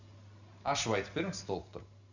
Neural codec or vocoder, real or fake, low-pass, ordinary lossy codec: none; real; 7.2 kHz; none